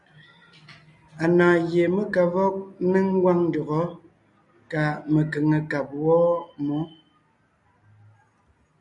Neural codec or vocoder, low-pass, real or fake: none; 10.8 kHz; real